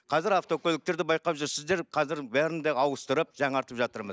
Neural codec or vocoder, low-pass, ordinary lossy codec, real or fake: none; none; none; real